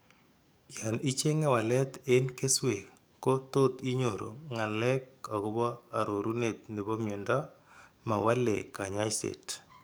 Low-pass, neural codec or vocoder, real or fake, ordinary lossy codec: none; codec, 44.1 kHz, 7.8 kbps, DAC; fake; none